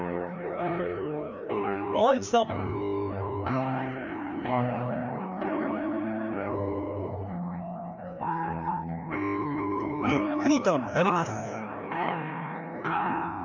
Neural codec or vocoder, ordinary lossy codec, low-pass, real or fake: codec, 16 kHz, 1 kbps, FreqCodec, larger model; none; 7.2 kHz; fake